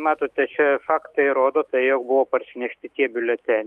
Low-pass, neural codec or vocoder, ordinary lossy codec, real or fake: 19.8 kHz; autoencoder, 48 kHz, 128 numbers a frame, DAC-VAE, trained on Japanese speech; Opus, 32 kbps; fake